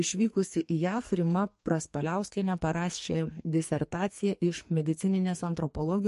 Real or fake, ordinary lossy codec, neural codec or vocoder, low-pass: fake; MP3, 48 kbps; codec, 44.1 kHz, 2.6 kbps, SNAC; 14.4 kHz